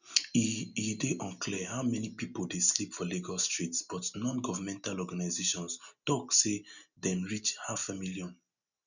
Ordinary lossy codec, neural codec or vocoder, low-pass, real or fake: none; none; 7.2 kHz; real